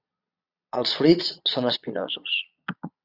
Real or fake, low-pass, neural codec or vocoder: fake; 5.4 kHz; vocoder, 22.05 kHz, 80 mel bands, Vocos